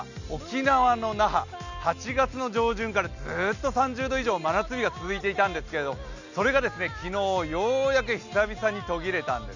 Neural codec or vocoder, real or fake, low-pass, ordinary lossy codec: none; real; 7.2 kHz; none